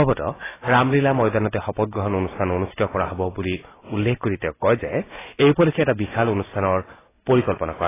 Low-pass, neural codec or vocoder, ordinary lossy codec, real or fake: 3.6 kHz; none; AAC, 16 kbps; real